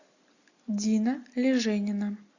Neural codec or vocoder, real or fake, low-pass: none; real; 7.2 kHz